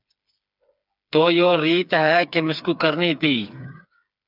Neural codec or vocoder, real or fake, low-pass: codec, 16 kHz, 4 kbps, FreqCodec, smaller model; fake; 5.4 kHz